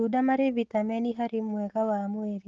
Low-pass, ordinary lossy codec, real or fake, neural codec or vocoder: 7.2 kHz; Opus, 16 kbps; real; none